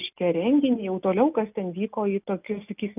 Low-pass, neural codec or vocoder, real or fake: 3.6 kHz; none; real